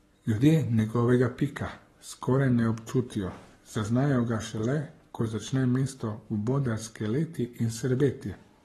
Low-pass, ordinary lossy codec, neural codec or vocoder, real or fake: 19.8 kHz; AAC, 32 kbps; codec, 44.1 kHz, 7.8 kbps, Pupu-Codec; fake